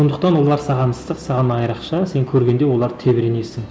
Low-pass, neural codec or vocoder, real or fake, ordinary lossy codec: none; none; real; none